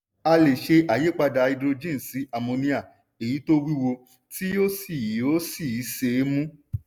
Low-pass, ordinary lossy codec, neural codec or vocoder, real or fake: none; none; none; real